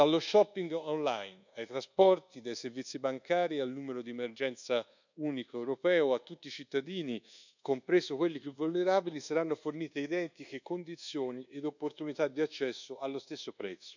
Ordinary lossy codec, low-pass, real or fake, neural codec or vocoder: none; 7.2 kHz; fake; codec, 24 kHz, 1.2 kbps, DualCodec